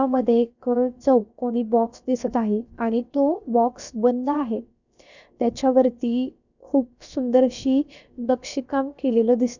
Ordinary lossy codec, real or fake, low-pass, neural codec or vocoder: none; fake; 7.2 kHz; codec, 16 kHz, about 1 kbps, DyCAST, with the encoder's durations